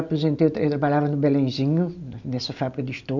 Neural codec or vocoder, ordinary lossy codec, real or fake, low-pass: none; none; real; 7.2 kHz